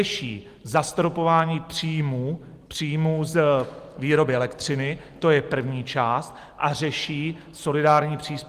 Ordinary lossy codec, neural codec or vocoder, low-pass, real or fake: Opus, 24 kbps; none; 14.4 kHz; real